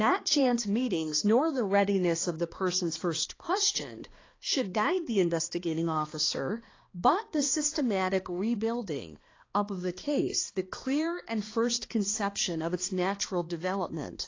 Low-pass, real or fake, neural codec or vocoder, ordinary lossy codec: 7.2 kHz; fake; codec, 16 kHz, 2 kbps, X-Codec, HuBERT features, trained on balanced general audio; AAC, 32 kbps